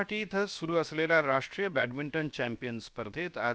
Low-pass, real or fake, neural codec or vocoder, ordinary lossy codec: none; fake; codec, 16 kHz, 0.7 kbps, FocalCodec; none